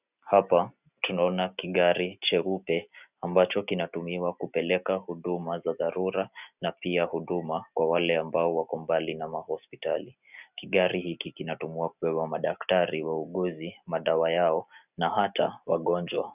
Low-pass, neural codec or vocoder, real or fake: 3.6 kHz; none; real